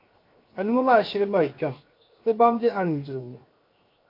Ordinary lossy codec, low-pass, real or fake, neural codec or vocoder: MP3, 32 kbps; 5.4 kHz; fake; codec, 16 kHz, 0.7 kbps, FocalCodec